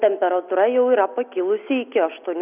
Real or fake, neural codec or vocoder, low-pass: real; none; 3.6 kHz